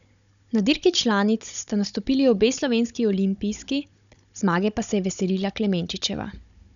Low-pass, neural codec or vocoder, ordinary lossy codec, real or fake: 7.2 kHz; codec, 16 kHz, 16 kbps, FunCodec, trained on Chinese and English, 50 frames a second; none; fake